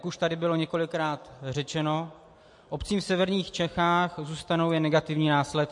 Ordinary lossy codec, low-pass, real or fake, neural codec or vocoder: MP3, 48 kbps; 10.8 kHz; real; none